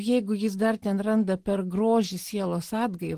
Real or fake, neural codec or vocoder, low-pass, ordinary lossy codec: real; none; 14.4 kHz; Opus, 16 kbps